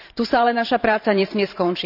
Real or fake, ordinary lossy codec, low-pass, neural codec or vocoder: fake; none; 5.4 kHz; vocoder, 44.1 kHz, 128 mel bands every 512 samples, BigVGAN v2